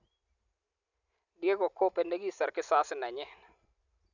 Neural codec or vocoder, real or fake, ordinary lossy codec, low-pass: none; real; none; 7.2 kHz